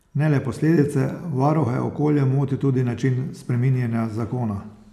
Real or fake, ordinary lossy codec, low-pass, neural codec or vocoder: real; none; 14.4 kHz; none